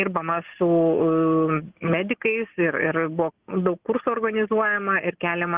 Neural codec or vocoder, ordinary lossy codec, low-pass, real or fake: none; Opus, 16 kbps; 3.6 kHz; real